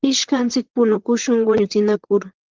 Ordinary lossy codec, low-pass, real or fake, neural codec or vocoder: Opus, 16 kbps; 7.2 kHz; fake; vocoder, 44.1 kHz, 128 mel bands, Pupu-Vocoder